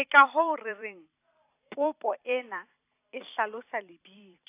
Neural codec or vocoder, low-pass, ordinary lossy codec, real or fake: vocoder, 44.1 kHz, 128 mel bands every 256 samples, BigVGAN v2; 3.6 kHz; AAC, 24 kbps; fake